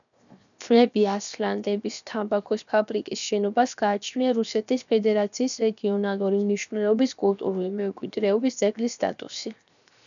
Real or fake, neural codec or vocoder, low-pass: fake; codec, 16 kHz, 0.7 kbps, FocalCodec; 7.2 kHz